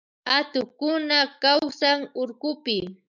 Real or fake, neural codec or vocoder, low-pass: fake; autoencoder, 48 kHz, 128 numbers a frame, DAC-VAE, trained on Japanese speech; 7.2 kHz